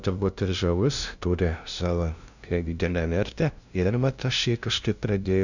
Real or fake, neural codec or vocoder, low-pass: fake; codec, 16 kHz, 0.5 kbps, FunCodec, trained on LibriTTS, 25 frames a second; 7.2 kHz